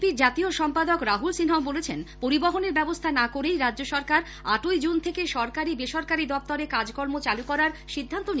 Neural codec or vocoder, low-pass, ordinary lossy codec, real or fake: none; none; none; real